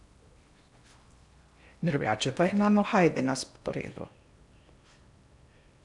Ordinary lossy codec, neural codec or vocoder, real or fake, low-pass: none; codec, 16 kHz in and 24 kHz out, 0.8 kbps, FocalCodec, streaming, 65536 codes; fake; 10.8 kHz